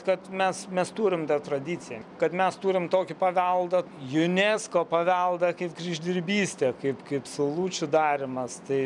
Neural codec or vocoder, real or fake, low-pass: vocoder, 44.1 kHz, 128 mel bands every 256 samples, BigVGAN v2; fake; 10.8 kHz